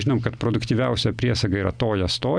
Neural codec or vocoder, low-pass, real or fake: none; 9.9 kHz; real